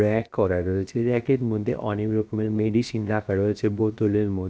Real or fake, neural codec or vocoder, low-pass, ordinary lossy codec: fake; codec, 16 kHz, 0.7 kbps, FocalCodec; none; none